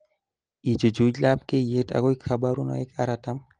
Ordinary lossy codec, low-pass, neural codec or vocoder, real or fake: Opus, 24 kbps; 9.9 kHz; none; real